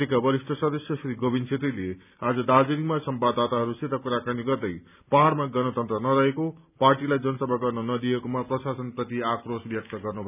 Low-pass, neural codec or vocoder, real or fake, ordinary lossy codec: 3.6 kHz; none; real; none